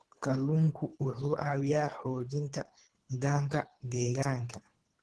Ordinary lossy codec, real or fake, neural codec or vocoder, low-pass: Opus, 16 kbps; fake; codec, 24 kHz, 3 kbps, HILCodec; 10.8 kHz